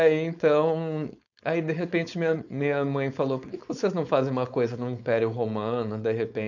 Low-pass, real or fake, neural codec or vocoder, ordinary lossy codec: 7.2 kHz; fake; codec, 16 kHz, 4.8 kbps, FACodec; none